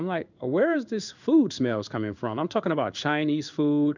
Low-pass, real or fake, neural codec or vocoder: 7.2 kHz; fake; codec, 16 kHz in and 24 kHz out, 1 kbps, XY-Tokenizer